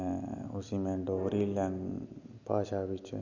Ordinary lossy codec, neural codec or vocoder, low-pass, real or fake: none; none; 7.2 kHz; real